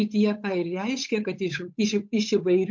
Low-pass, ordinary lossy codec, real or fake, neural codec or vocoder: 7.2 kHz; MP3, 48 kbps; fake; codec, 16 kHz, 8 kbps, FunCodec, trained on Chinese and English, 25 frames a second